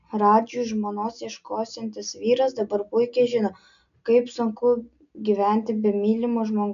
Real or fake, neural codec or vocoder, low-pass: real; none; 7.2 kHz